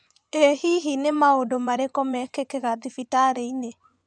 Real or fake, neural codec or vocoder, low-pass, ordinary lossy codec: fake; vocoder, 24 kHz, 100 mel bands, Vocos; 9.9 kHz; none